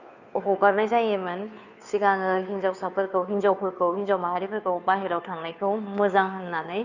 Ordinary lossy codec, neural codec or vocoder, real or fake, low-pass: none; codec, 16 kHz, 2 kbps, FunCodec, trained on Chinese and English, 25 frames a second; fake; 7.2 kHz